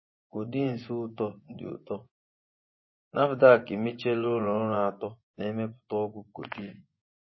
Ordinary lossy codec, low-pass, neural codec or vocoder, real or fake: MP3, 24 kbps; 7.2 kHz; vocoder, 24 kHz, 100 mel bands, Vocos; fake